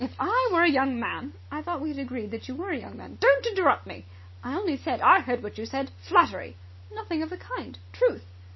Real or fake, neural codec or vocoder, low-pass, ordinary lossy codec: real; none; 7.2 kHz; MP3, 24 kbps